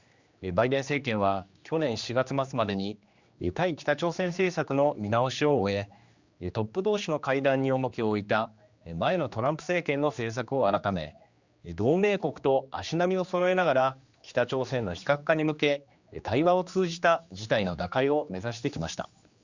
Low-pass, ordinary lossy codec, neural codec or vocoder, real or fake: 7.2 kHz; Opus, 64 kbps; codec, 16 kHz, 2 kbps, X-Codec, HuBERT features, trained on general audio; fake